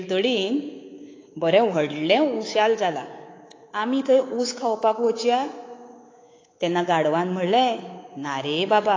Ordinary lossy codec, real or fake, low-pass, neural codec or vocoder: AAC, 32 kbps; fake; 7.2 kHz; codec, 24 kHz, 3.1 kbps, DualCodec